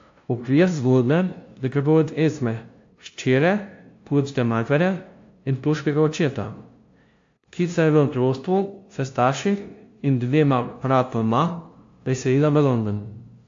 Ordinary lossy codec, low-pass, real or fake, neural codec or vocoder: AAC, 48 kbps; 7.2 kHz; fake; codec, 16 kHz, 0.5 kbps, FunCodec, trained on LibriTTS, 25 frames a second